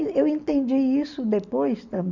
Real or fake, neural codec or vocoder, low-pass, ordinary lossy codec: real; none; 7.2 kHz; none